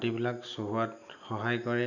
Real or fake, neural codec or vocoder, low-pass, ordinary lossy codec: real; none; 7.2 kHz; none